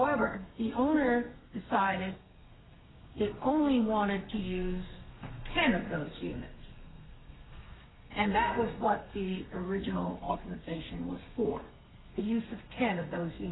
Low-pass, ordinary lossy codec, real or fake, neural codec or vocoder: 7.2 kHz; AAC, 16 kbps; fake; codec, 32 kHz, 1.9 kbps, SNAC